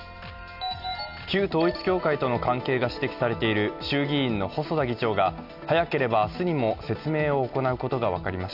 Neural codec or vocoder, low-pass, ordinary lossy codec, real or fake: none; 5.4 kHz; none; real